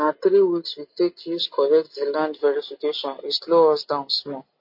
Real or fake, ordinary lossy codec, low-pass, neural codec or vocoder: real; MP3, 32 kbps; 5.4 kHz; none